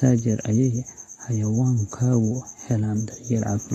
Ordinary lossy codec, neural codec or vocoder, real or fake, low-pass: AAC, 32 kbps; none; real; 19.8 kHz